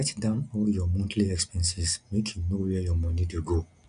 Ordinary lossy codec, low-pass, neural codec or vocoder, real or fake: none; 9.9 kHz; none; real